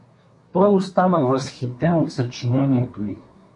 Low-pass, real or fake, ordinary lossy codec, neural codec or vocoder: 10.8 kHz; fake; MP3, 48 kbps; codec, 24 kHz, 1 kbps, SNAC